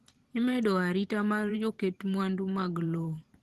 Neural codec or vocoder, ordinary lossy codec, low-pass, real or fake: vocoder, 44.1 kHz, 128 mel bands every 512 samples, BigVGAN v2; Opus, 16 kbps; 14.4 kHz; fake